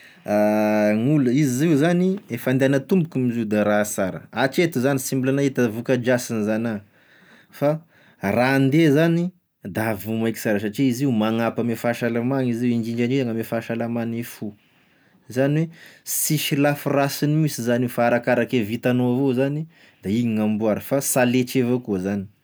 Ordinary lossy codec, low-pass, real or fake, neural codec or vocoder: none; none; real; none